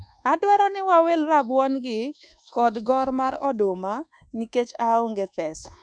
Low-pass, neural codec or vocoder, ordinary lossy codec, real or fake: 9.9 kHz; codec, 24 kHz, 1.2 kbps, DualCodec; none; fake